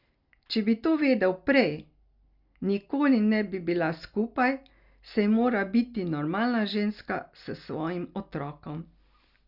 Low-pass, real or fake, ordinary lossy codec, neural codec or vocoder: 5.4 kHz; real; Opus, 64 kbps; none